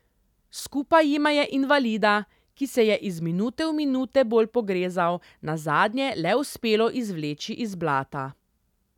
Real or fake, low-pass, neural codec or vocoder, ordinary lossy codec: real; 19.8 kHz; none; none